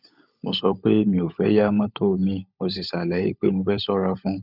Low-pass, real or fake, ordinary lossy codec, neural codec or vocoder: 5.4 kHz; fake; none; codec, 16 kHz, 16 kbps, FunCodec, trained on LibriTTS, 50 frames a second